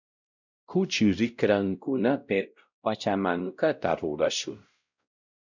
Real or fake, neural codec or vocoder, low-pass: fake; codec, 16 kHz, 0.5 kbps, X-Codec, WavLM features, trained on Multilingual LibriSpeech; 7.2 kHz